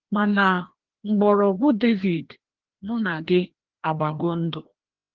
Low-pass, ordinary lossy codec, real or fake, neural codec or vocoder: 7.2 kHz; Opus, 16 kbps; fake; codec, 16 kHz, 1 kbps, FreqCodec, larger model